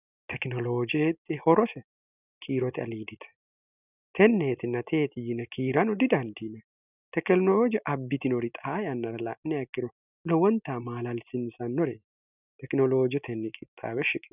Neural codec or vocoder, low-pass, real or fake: none; 3.6 kHz; real